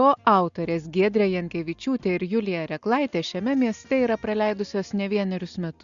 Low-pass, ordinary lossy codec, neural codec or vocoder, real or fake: 7.2 kHz; Opus, 64 kbps; none; real